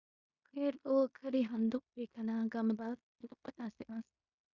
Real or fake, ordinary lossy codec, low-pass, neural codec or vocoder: fake; Opus, 64 kbps; 7.2 kHz; codec, 16 kHz in and 24 kHz out, 0.9 kbps, LongCat-Audio-Codec, fine tuned four codebook decoder